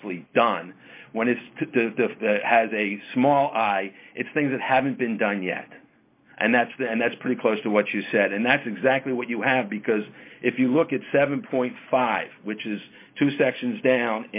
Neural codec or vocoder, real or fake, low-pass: none; real; 3.6 kHz